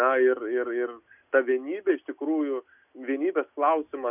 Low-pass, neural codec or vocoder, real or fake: 3.6 kHz; none; real